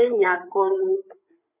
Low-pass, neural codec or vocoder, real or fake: 3.6 kHz; codec, 16 kHz, 8 kbps, FreqCodec, larger model; fake